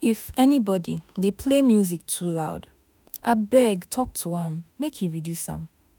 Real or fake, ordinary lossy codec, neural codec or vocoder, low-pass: fake; none; autoencoder, 48 kHz, 32 numbers a frame, DAC-VAE, trained on Japanese speech; none